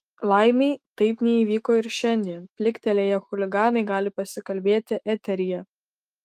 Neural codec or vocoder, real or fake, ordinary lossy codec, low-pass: autoencoder, 48 kHz, 128 numbers a frame, DAC-VAE, trained on Japanese speech; fake; Opus, 32 kbps; 14.4 kHz